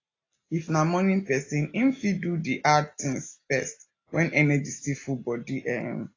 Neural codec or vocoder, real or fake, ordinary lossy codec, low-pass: none; real; AAC, 32 kbps; 7.2 kHz